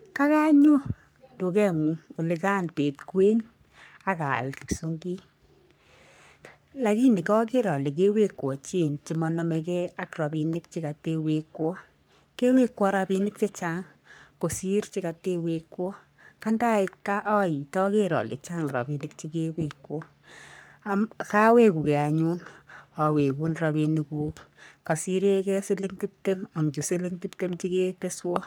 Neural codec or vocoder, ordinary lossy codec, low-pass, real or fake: codec, 44.1 kHz, 3.4 kbps, Pupu-Codec; none; none; fake